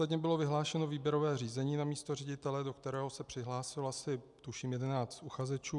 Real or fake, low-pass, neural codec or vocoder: real; 10.8 kHz; none